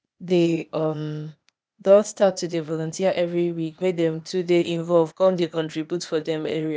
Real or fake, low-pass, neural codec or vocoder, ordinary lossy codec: fake; none; codec, 16 kHz, 0.8 kbps, ZipCodec; none